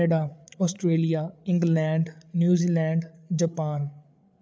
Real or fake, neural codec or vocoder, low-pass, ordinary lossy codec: fake; codec, 16 kHz, 8 kbps, FreqCodec, larger model; none; none